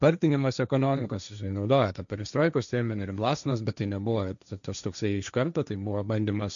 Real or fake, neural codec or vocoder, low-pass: fake; codec, 16 kHz, 1.1 kbps, Voila-Tokenizer; 7.2 kHz